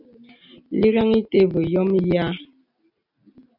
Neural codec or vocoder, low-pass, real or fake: none; 5.4 kHz; real